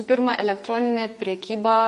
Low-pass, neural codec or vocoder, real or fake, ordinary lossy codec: 14.4 kHz; codec, 44.1 kHz, 2.6 kbps, SNAC; fake; MP3, 48 kbps